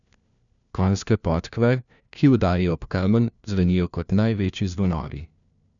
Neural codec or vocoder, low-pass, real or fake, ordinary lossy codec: codec, 16 kHz, 1 kbps, FunCodec, trained on LibriTTS, 50 frames a second; 7.2 kHz; fake; MP3, 96 kbps